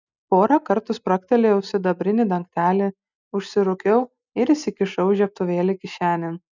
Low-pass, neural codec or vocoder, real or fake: 7.2 kHz; none; real